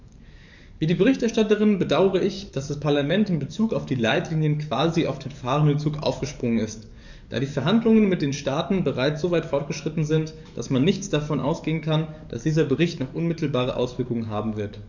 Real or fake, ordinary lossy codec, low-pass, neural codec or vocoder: fake; none; 7.2 kHz; codec, 44.1 kHz, 7.8 kbps, DAC